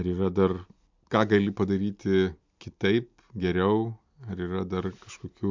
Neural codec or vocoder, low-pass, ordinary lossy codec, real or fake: none; 7.2 kHz; MP3, 64 kbps; real